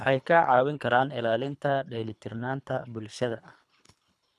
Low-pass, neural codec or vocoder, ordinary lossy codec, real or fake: none; codec, 24 kHz, 3 kbps, HILCodec; none; fake